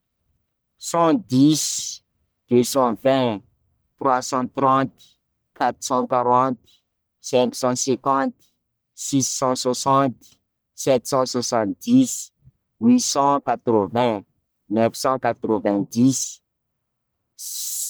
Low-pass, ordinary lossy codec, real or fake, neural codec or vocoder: none; none; fake; codec, 44.1 kHz, 1.7 kbps, Pupu-Codec